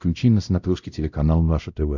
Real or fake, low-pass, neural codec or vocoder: fake; 7.2 kHz; codec, 16 kHz, 0.5 kbps, X-Codec, WavLM features, trained on Multilingual LibriSpeech